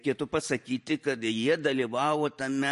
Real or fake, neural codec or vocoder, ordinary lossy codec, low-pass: fake; vocoder, 44.1 kHz, 128 mel bands every 512 samples, BigVGAN v2; MP3, 48 kbps; 14.4 kHz